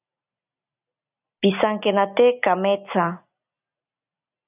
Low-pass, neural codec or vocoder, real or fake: 3.6 kHz; none; real